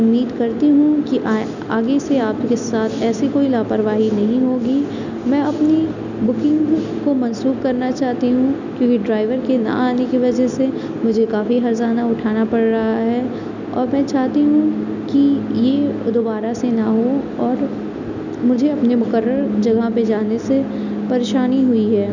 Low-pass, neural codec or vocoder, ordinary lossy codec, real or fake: 7.2 kHz; none; none; real